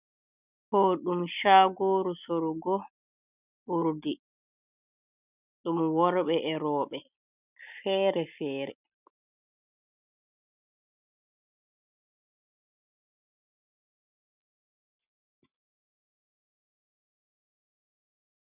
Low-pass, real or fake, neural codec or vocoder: 3.6 kHz; real; none